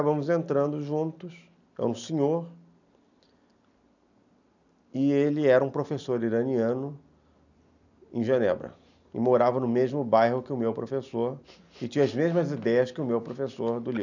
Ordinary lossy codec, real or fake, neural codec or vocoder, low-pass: none; real; none; 7.2 kHz